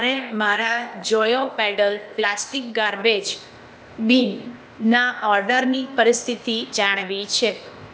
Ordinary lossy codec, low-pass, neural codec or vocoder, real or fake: none; none; codec, 16 kHz, 0.8 kbps, ZipCodec; fake